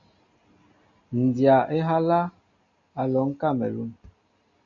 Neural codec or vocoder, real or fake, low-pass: none; real; 7.2 kHz